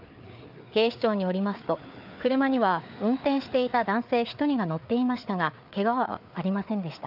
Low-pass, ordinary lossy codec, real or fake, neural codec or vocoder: 5.4 kHz; none; fake; codec, 16 kHz, 4 kbps, FreqCodec, larger model